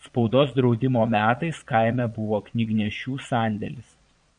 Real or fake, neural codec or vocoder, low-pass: fake; vocoder, 22.05 kHz, 80 mel bands, Vocos; 9.9 kHz